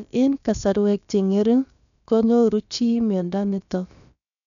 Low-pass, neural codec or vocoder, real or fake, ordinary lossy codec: 7.2 kHz; codec, 16 kHz, about 1 kbps, DyCAST, with the encoder's durations; fake; none